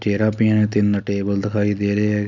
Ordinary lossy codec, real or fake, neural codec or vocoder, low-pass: none; real; none; 7.2 kHz